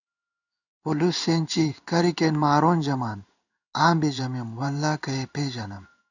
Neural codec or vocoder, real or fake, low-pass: codec, 16 kHz in and 24 kHz out, 1 kbps, XY-Tokenizer; fake; 7.2 kHz